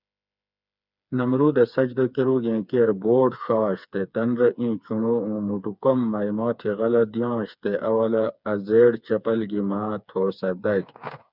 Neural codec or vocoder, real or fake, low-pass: codec, 16 kHz, 4 kbps, FreqCodec, smaller model; fake; 5.4 kHz